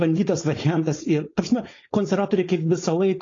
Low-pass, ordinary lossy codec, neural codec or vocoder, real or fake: 7.2 kHz; AAC, 32 kbps; codec, 16 kHz, 4.8 kbps, FACodec; fake